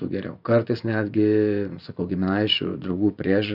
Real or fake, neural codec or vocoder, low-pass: fake; vocoder, 24 kHz, 100 mel bands, Vocos; 5.4 kHz